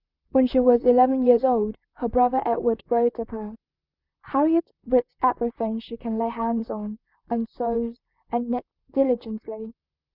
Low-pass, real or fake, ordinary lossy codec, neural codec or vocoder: 5.4 kHz; fake; Opus, 64 kbps; vocoder, 44.1 kHz, 128 mel bands, Pupu-Vocoder